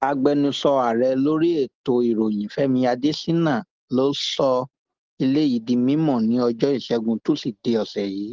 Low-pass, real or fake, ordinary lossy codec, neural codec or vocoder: 7.2 kHz; real; Opus, 16 kbps; none